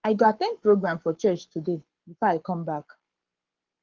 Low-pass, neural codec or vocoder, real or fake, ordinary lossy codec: 7.2 kHz; codec, 44.1 kHz, 7.8 kbps, Pupu-Codec; fake; Opus, 16 kbps